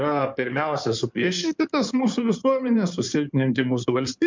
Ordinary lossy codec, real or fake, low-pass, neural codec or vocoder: MP3, 64 kbps; fake; 7.2 kHz; codec, 16 kHz in and 24 kHz out, 2.2 kbps, FireRedTTS-2 codec